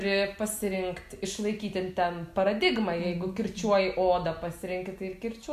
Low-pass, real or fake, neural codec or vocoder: 14.4 kHz; real; none